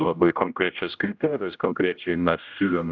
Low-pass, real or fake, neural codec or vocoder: 7.2 kHz; fake; codec, 16 kHz, 0.5 kbps, X-Codec, HuBERT features, trained on general audio